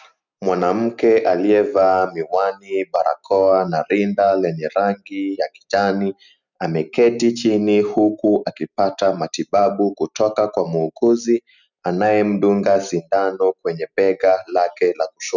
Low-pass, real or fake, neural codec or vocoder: 7.2 kHz; real; none